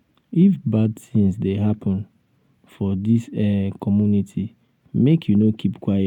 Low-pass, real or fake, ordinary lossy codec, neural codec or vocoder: 19.8 kHz; real; none; none